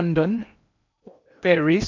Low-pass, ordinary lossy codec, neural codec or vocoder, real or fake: 7.2 kHz; none; codec, 16 kHz in and 24 kHz out, 0.8 kbps, FocalCodec, streaming, 65536 codes; fake